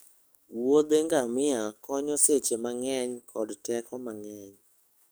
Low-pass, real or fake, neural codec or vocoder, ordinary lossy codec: none; fake; codec, 44.1 kHz, 7.8 kbps, DAC; none